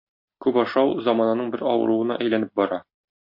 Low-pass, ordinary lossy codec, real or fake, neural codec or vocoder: 5.4 kHz; MP3, 32 kbps; real; none